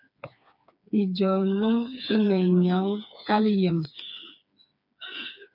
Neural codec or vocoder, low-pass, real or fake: codec, 16 kHz, 4 kbps, FreqCodec, smaller model; 5.4 kHz; fake